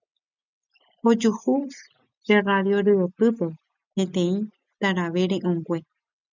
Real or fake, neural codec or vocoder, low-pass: real; none; 7.2 kHz